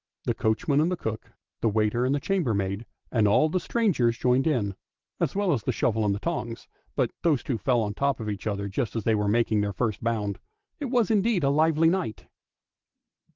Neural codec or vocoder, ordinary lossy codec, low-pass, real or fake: none; Opus, 16 kbps; 7.2 kHz; real